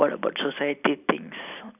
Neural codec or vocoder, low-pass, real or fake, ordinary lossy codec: none; 3.6 kHz; real; none